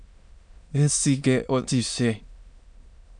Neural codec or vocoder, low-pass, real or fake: autoencoder, 22.05 kHz, a latent of 192 numbers a frame, VITS, trained on many speakers; 9.9 kHz; fake